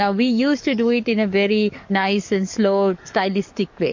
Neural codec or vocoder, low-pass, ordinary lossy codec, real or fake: codec, 44.1 kHz, 7.8 kbps, DAC; 7.2 kHz; MP3, 48 kbps; fake